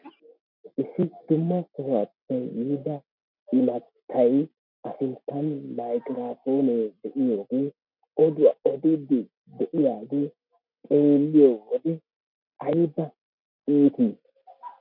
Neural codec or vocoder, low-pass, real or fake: none; 5.4 kHz; real